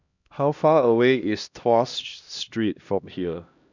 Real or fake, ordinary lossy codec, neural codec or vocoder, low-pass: fake; none; codec, 16 kHz, 1 kbps, X-Codec, HuBERT features, trained on LibriSpeech; 7.2 kHz